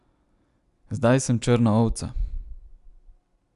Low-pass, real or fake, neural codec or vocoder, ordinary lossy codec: 10.8 kHz; real; none; none